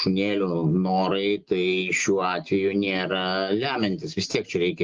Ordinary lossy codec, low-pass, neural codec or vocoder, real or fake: Opus, 32 kbps; 7.2 kHz; none; real